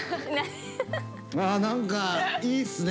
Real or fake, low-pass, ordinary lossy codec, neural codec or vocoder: real; none; none; none